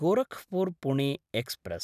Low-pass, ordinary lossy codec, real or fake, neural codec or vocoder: 14.4 kHz; none; real; none